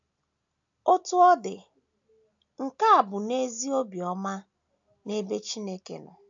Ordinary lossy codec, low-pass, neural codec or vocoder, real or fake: AAC, 64 kbps; 7.2 kHz; none; real